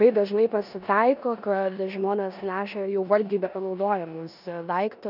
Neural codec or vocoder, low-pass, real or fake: codec, 16 kHz in and 24 kHz out, 0.9 kbps, LongCat-Audio-Codec, four codebook decoder; 5.4 kHz; fake